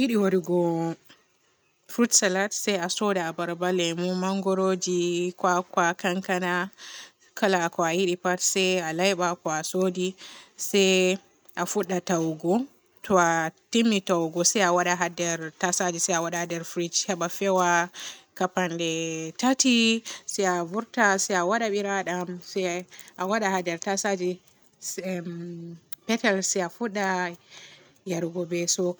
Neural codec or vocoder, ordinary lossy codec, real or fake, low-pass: none; none; real; none